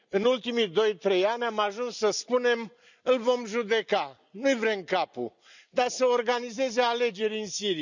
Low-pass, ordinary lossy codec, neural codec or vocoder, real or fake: 7.2 kHz; none; none; real